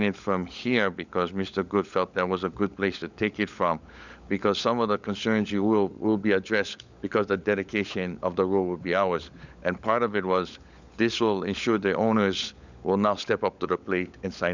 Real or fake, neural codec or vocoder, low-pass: fake; codec, 16 kHz, 16 kbps, FunCodec, trained on LibriTTS, 50 frames a second; 7.2 kHz